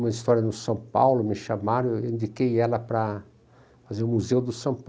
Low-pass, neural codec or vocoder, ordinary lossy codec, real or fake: none; none; none; real